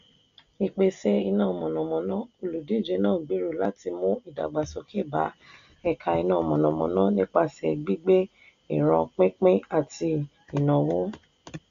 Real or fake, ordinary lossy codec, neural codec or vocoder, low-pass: real; none; none; 7.2 kHz